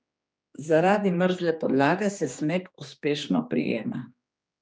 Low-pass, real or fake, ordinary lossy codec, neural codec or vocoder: none; fake; none; codec, 16 kHz, 2 kbps, X-Codec, HuBERT features, trained on general audio